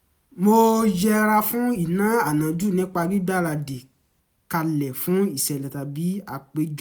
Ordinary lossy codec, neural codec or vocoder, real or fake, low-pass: none; none; real; none